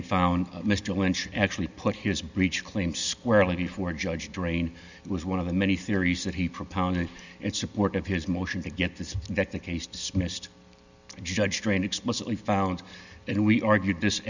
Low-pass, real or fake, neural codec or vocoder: 7.2 kHz; real; none